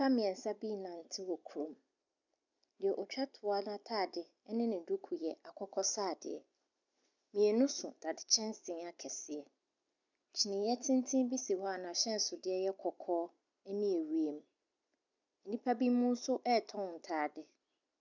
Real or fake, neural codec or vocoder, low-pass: real; none; 7.2 kHz